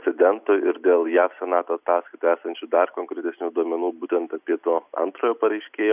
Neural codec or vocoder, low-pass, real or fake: none; 3.6 kHz; real